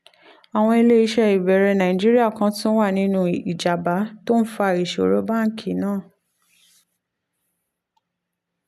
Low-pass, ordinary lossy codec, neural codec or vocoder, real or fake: 14.4 kHz; none; none; real